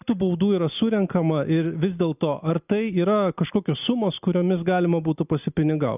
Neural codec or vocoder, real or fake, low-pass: none; real; 3.6 kHz